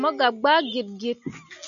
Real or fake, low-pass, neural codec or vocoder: real; 7.2 kHz; none